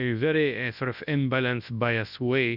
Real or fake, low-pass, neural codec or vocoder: fake; 5.4 kHz; codec, 24 kHz, 0.9 kbps, WavTokenizer, large speech release